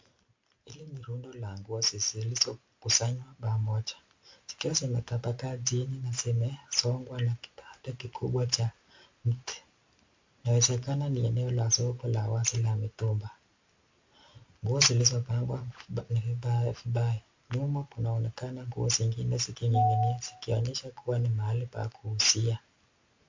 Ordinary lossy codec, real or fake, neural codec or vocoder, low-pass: MP3, 48 kbps; real; none; 7.2 kHz